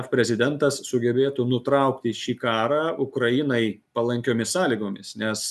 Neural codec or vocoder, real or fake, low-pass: none; real; 14.4 kHz